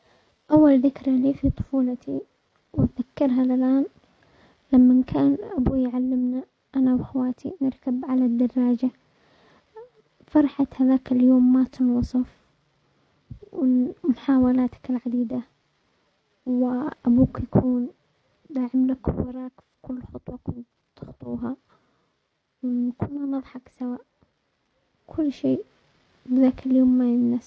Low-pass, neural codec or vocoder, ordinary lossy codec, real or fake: none; none; none; real